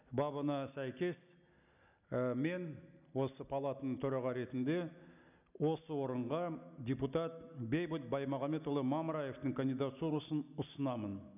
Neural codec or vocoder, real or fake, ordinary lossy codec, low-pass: none; real; none; 3.6 kHz